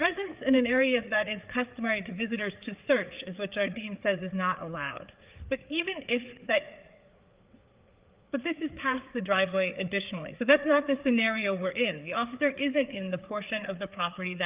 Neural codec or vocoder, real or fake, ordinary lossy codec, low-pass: codec, 16 kHz, 4 kbps, FreqCodec, larger model; fake; Opus, 32 kbps; 3.6 kHz